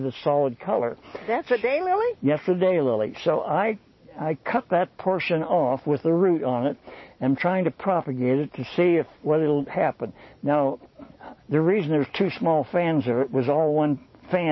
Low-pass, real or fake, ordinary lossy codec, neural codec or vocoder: 7.2 kHz; real; MP3, 24 kbps; none